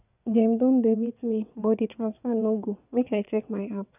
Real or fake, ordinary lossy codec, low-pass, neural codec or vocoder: fake; none; 3.6 kHz; vocoder, 22.05 kHz, 80 mel bands, WaveNeXt